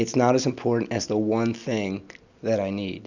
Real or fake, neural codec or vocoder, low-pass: real; none; 7.2 kHz